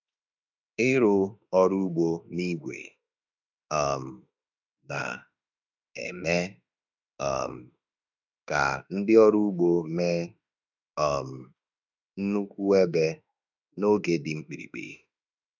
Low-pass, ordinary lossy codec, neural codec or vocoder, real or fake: 7.2 kHz; none; autoencoder, 48 kHz, 32 numbers a frame, DAC-VAE, trained on Japanese speech; fake